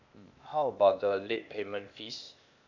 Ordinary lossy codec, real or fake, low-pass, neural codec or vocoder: none; fake; 7.2 kHz; codec, 16 kHz, 0.8 kbps, ZipCodec